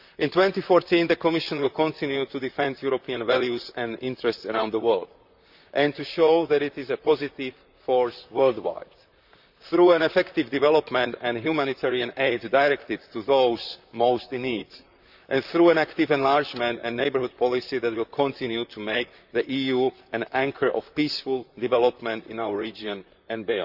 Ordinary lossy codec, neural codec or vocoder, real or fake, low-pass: none; vocoder, 44.1 kHz, 128 mel bands, Pupu-Vocoder; fake; 5.4 kHz